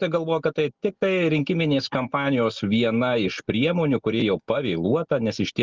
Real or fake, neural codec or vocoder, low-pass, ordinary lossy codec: real; none; 7.2 kHz; Opus, 24 kbps